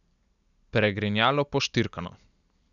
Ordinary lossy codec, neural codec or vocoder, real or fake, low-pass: none; none; real; 7.2 kHz